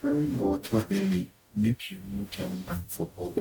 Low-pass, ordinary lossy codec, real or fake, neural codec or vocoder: 19.8 kHz; none; fake; codec, 44.1 kHz, 0.9 kbps, DAC